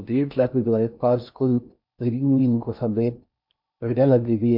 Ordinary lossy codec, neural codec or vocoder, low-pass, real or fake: MP3, 48 kbps; codec, 16 kHz in and 24 kHz out, 0.6 kbps, FocalCodec, streaming, 4096 codes; 5.4 kHz; fake